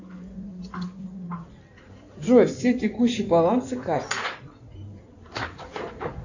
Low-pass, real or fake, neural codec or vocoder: 7.2 kHz; fake; codec, 16 kHz in and 24 kHz out, 2.2 kbps, FireRedTTS-2 codec